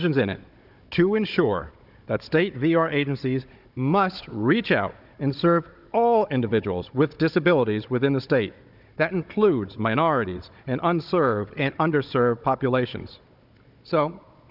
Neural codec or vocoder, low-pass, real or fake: codec, 16 kHz, 16 kbps, FunCodec, trained on Chinese and English, 50 frames a second; 5.4 kHz; fake